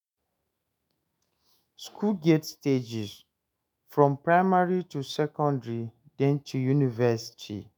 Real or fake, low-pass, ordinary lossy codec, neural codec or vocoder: fake; none; none; autoencoder, 48 kHz, 128 numbers a frame, DAC-VAE, trained on Japanese speech